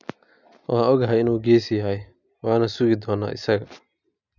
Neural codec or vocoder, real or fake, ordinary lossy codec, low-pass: none; real; none; 7.2 kHz